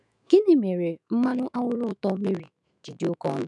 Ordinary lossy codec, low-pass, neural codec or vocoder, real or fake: none; 10.8 kHz; autoencoder, 48 kHz, 128 numbers a frame, DAC-VAE, trained on Japanese speech; fake